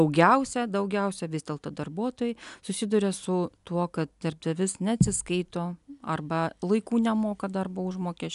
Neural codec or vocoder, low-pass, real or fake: none; 10.8 kHz; real